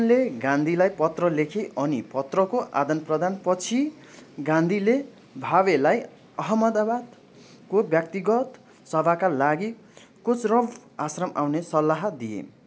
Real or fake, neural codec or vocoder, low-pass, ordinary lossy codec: real; none; none; none